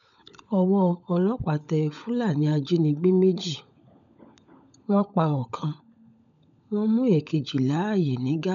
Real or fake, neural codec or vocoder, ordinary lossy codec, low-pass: fake; codec, 16 kHz, 16 kbps, FunCodec, trained on LibriTTS, 50 frames a second; none; 7.2 kHz